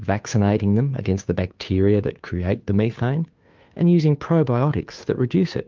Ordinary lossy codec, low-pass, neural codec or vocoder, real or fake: Opus, 24 kbps; 7.2 kHz; codec, 16 kHz, 2 kbps, FunCodec, trained on Chinese and English, 25 frames a second; fake